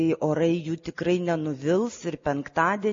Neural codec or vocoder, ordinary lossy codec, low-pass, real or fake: none; MP3, 32 kbps; 7.2 kHz; real